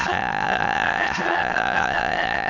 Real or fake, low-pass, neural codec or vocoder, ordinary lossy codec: fake; 7.2 kHz; autoencoder, 22.05 kHz, a latent of 192 numbers a frame, VITS, trained on many speakers; none